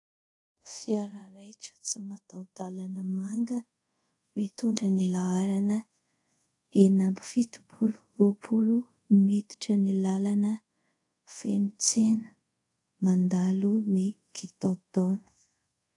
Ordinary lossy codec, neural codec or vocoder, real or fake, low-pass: AAC, 48 kbps; codec, 24 kHz, 0.5 kbps, DualCodec; fake; 10.8 kHz